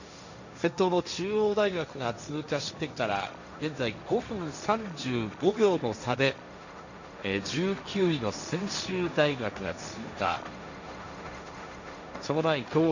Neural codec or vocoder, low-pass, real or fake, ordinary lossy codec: codec, 16 kHz, 1.1 kbps, Voila-Tokenizer; 7.2 kHz; fake; none